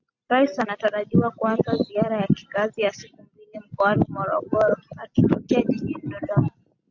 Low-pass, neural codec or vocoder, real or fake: 7.2 kHz; none; real